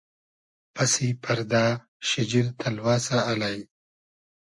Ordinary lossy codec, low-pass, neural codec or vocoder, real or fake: MP3, 48 kbps; 10.8 kHz; none; real